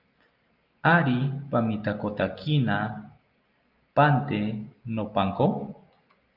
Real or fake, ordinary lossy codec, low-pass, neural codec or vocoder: real; Opus, 24 kbps; 5.4 kHz; none